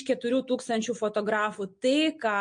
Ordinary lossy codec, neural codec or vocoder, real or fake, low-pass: MP3, 48 kbps; none; real; 9.9 kHz